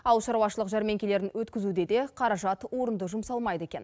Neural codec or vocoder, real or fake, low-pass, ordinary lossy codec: none; real; none; none